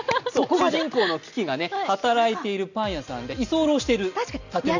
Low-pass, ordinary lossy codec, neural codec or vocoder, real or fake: 7.2 kHz; none; none; real